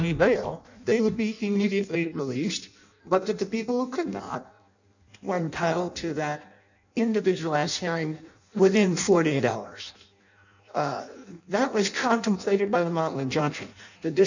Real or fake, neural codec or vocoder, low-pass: fake; codec, 16 kHz in and 24 kHz out, 0.6 kbps, FireRedTTS-2 codec; 7.2 kHz